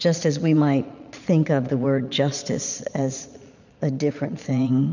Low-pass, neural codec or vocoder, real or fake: 7.2 kHz; vocoder, 22.05 kHz, 80 mel bands, Vocos; fake